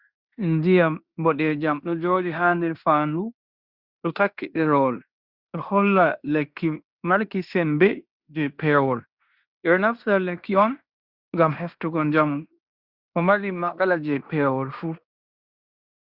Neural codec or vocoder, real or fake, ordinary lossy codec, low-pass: codec, 16 kHz in and 24 kHz out, 0.9 kbps, LongCat-Audio-Codec, fine tuned four codebook decoder; fake; Opus, 64 kbps; 5.4 kHz